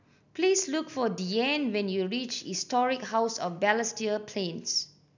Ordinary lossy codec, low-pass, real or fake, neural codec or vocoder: none; 7.2 kHz; real; none